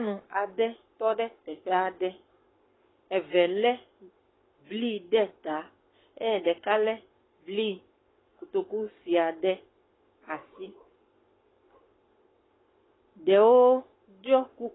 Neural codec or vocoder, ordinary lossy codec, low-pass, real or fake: codec, 44.1 kHz, 7.8 kbps, Pupu-Codec; AAC, 16 kbps; 7.2 kHz; fake